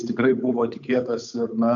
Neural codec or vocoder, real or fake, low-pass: codec, 16 kHz, 8 kbps, FunCodec, trained on Chinese and English, 25 frames a second; fake; 7.2 kHz